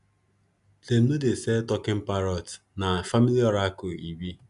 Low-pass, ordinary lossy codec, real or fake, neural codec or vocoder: 10.8 kHz; none; real; none